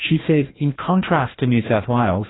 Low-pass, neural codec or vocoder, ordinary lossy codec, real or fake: 7.2 kHz; codec, 16 kHz, 1 kbps, FreqCodec, larger model; AAC, 16 kbps; fake